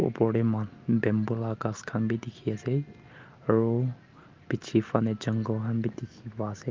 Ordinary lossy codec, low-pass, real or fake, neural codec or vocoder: Opus, 24 kbps; 7.2 kHz; real; none